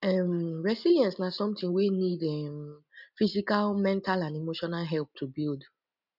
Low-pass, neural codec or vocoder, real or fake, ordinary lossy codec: 5.4 kHz; none; real; none